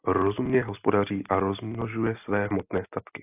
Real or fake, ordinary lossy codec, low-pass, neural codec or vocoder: real; AAC, 32 kbps; 3.6 kHz; none